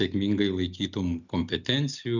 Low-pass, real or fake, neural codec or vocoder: 7.2 kHz; real; none